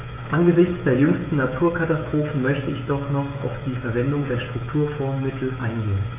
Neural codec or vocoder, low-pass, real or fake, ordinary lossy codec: codec, 16 kHz, 16 kbps, FreqCodec, smaller model; 3.6 kHz; fake; none